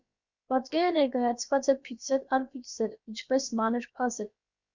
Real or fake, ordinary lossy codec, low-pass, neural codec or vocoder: fake; Opus, 64 kbps; 7.2 kHz; codec, 16 kHz, about 1 kbps, DyCAST, with the encoder's durations